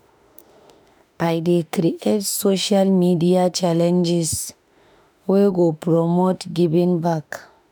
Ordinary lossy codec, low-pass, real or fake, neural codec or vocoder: none; none; fake; autoencoder, 48 kHz, 32 numbers a frame, DAC-VAE, trained on Japanese speech